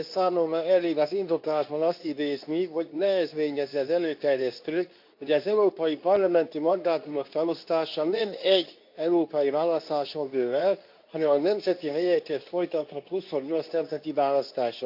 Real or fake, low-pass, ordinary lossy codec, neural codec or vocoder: fake; 5.4 kHz; AAC, 48 kbps; codec, 24 kHz, 0.9 kbps, WavTokenizer, medium speech release version 2